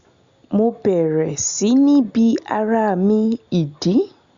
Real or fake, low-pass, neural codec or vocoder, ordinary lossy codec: real; 7.2 kHz; none; Opus, 64 kbps